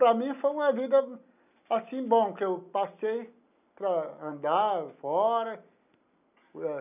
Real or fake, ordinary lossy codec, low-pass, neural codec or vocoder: real; none; 3.6 kHz; none